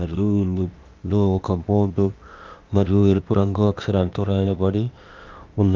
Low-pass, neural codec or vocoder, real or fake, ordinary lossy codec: 7.2 kHz; codec, 16 kHz, 0.8 kbps, ZipCodec; fake; Opus, 24 kbps